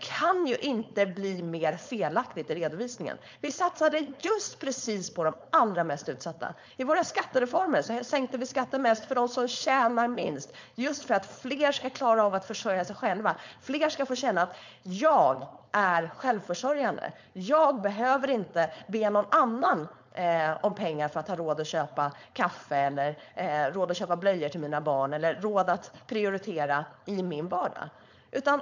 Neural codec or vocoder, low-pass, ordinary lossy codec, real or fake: codec, 16 kHz, 4.8 kbps, FACodec; 7.2 kHz; MP3, 64 kbps; fake